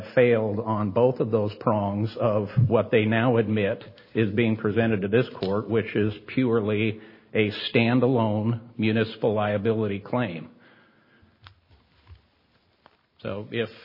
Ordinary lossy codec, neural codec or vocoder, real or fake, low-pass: MP3, 24 kbps; none; real; 5.4 kHz